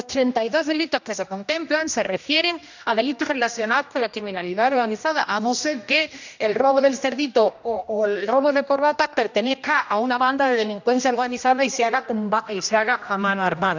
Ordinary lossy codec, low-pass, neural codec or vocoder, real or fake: none; 7.2 kHz; codec, 16 kHz, 1 kbps, X-Codec, HuBERT features, trained on general audio; fake